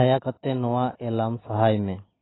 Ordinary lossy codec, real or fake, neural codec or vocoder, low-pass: AAC, 16 kbps; real; none; 7.2 kHz